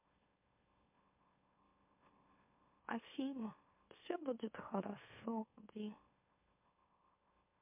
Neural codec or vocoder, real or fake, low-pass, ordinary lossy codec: autoencoder, 44.1 kHz, a latent of 192 numbers a frame, MeloTTS; fake; 3.6 kHz; MP3, 32 kbps